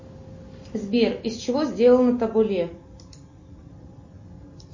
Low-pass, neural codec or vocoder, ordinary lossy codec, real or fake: 7.2 kHz; none; MP3, 32 kbps; real